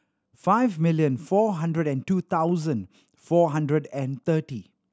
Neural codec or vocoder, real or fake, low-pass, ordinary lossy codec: none; real; none; none